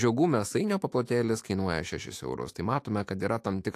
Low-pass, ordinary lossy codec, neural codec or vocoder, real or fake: 14.4 kHz; AAC, 64 kbps; autoencoder, 48 kHz, 128 numbers a frame, DAC-VAE, trained on Japanese speech; fake